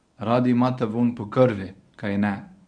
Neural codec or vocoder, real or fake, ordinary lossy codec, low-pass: codec, 24 kHz, 0.9 kbps, WavTokenizer, medium speech release version 1; fake; none; 9.9 kHz